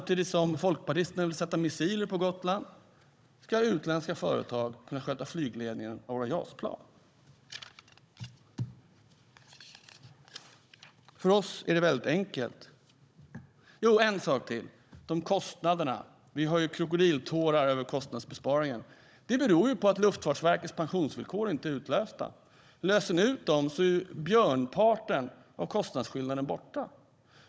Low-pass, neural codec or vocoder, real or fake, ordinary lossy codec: none; codec, 16 kHz, 16 kbps, FunCodec, trained on Chinese and English, 50 frames a second; fake; none